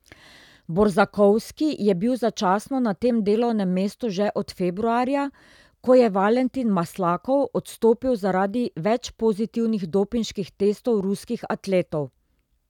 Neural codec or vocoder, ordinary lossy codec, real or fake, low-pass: none; none; real; 19.8 kHz